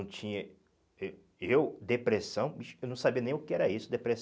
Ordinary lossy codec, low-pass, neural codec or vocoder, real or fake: none; none; none; real